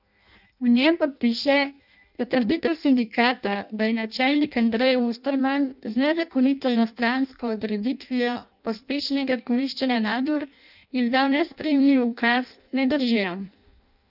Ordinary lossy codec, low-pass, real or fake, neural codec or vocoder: none; 5.4 kHz; fake; codec, 16 kHz in and 24 kHz out, 0.6 kbps, FireRedTTS-2 codec